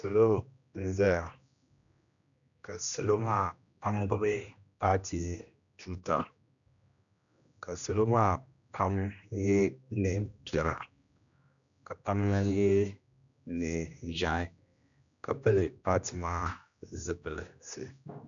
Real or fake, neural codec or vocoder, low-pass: fake; codec, 16 kHz, 1 kbps, X-Codec, HuBERT features, trained on general audio; 7.2 kHz